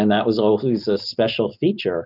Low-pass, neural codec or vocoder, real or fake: 5.4 kHz; none; real